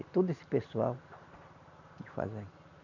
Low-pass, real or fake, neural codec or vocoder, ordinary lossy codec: 7.2 kHz; real; none; none